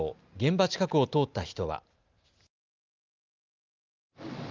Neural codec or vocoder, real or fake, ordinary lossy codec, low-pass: none; real; Opus, 24 kbps; 7.2 kHz